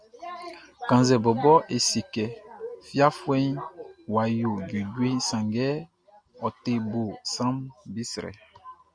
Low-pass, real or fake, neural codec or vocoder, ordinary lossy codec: 9.9 kHz; real; none; Opus, 64 kbps